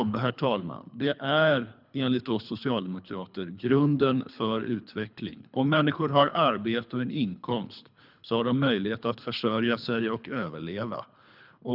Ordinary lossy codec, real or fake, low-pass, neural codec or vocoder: none; fake; 5.4 kHz; codec, 24 kHz, 3 kbps, HILCodec